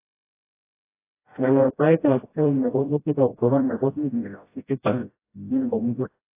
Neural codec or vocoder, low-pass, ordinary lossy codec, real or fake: codec, 16 kHz, 0.5 kbps, FreqCodec, smaller model; 3.6 kHz; AAC, 24 kbps; fake